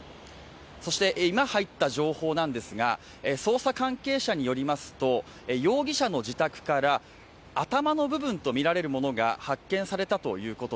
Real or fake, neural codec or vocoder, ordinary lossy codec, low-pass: real; none; none; none